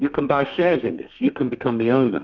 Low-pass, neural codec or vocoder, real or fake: 7.2 kHz; codec, 32 kHz, 1.9 kbps, SNAC; fake